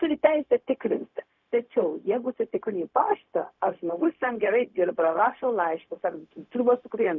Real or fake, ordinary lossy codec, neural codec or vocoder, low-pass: fake; MP3, 64 kbps; codec, 16 kHz, 0.4 kbps, LongCat-Audio-Codec; 7.2 kHz